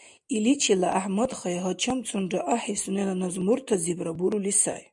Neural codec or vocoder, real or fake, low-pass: none; real; 10.8 kHz